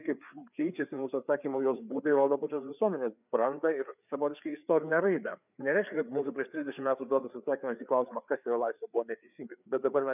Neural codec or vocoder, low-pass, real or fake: codec, 16 kHz, 4 kbps, FreqCodec, larger model; 3.6 kHz; fake